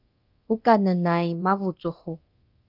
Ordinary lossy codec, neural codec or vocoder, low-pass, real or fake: Opus, 32 kbps; codec, 24 kHz, 0.9 kbps, DualCodec; 5.4 kHz; fake